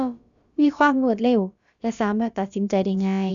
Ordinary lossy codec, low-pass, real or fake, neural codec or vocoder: none; 7.2 kHz; fake; codec, 16 kHz, about 1 kbps, DyCAST, with the encoder's durations